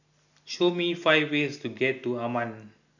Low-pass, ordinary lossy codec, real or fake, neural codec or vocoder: 7.2 kHz; none; real; none